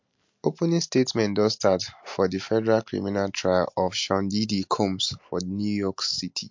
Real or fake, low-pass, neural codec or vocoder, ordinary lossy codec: real; 7.2 kHz; none; MP3, 48 kbps